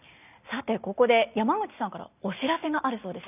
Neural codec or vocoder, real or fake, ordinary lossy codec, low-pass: none; real; none; 3.6 kHz